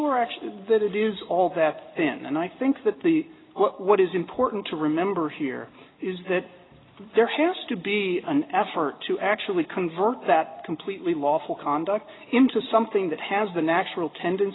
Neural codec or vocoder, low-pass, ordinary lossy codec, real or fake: none; 7.2 kHz; AAC, 16 kbps; real